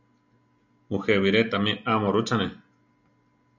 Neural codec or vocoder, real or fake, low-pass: none; real; 7.2 kHz